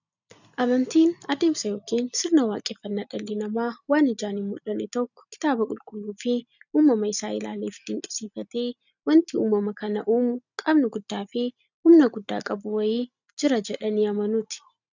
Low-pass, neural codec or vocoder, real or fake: 7.2 kHz; none; real